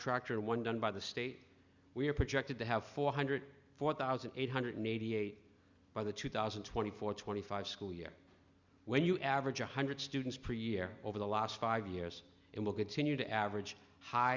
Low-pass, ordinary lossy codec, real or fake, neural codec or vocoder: 7.2 kHz; Opus, 64 kbps; real; none